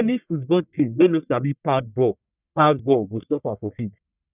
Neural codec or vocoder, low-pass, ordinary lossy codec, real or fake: codec, 44.1 kHz, 1.7 kbps, Pupu-Codec; 3.6 kHz; none; fake